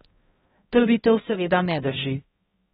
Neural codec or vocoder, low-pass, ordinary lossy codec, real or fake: codec, 16 kHz, 0.5 kbps, X-Codec, HuBERT features, trained on balanced general audio; 7.2 kHz; AAC, 16 kbps; fake